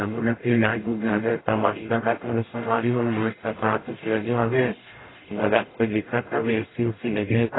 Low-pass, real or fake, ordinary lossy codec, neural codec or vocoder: 7.2 kHz; fake; AAC, 16 kbps; codec, 44.1 kHz, 0.9 kbps, DAC